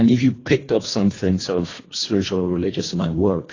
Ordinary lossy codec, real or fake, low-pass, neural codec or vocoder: AAC, 32 kbps; fake; 7.2 kHz; codec, 24 kHz, 1.5 kbps, HILCodec